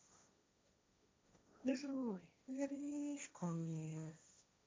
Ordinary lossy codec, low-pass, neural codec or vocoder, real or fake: none; none; codec, 16 kHz, 1.1 kbps, Voila-Tokenizer; fake